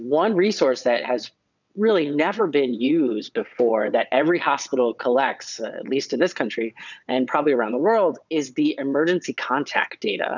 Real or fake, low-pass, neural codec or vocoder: fake; 7.2 kHz; vocoder, 22.05 kHz, 80 mel bands, WaveNeXt